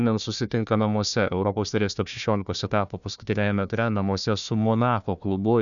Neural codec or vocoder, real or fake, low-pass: codec, 16 kHz, 1 kbps, FunCodec, trained on Chinese and English, 50 frames a second; fake; 7.2 kHz